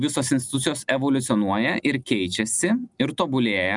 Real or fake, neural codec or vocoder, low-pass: real; none; 10.8 kHz